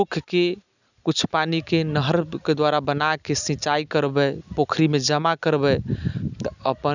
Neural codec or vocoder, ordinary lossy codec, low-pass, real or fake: none; none; 7.2 kHz; real